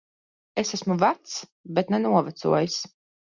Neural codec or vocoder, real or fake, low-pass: none; real; 7.2 kHz